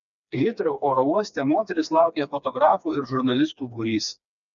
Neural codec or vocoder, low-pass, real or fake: codec, 16 kHz, 2 kbps, FreqCodec, smaller model; 7.2 kHz; fake